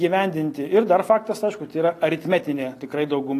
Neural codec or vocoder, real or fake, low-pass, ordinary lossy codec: none; real; 14.4 kHz; AAC, 48 kbps